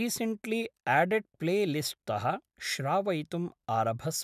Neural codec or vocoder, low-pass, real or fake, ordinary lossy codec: none; 14.4 kHz; real; none